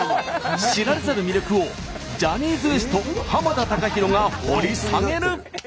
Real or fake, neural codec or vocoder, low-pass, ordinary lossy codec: real; none; none; none